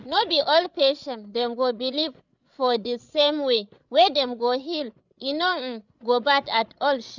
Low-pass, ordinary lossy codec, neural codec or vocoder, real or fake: 7.2 kHz; none; codec, 16 kHz, 16 kbps, FreqCodec, larger model; fake